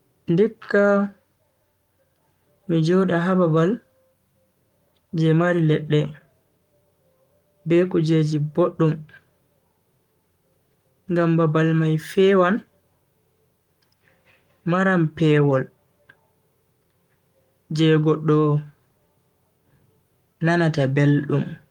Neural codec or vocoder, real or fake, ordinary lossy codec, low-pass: codec, 44.1 kHz, 7.8 kbps, Pupu-Codec; fake; Opus, 32 kbps; 19.8 kHz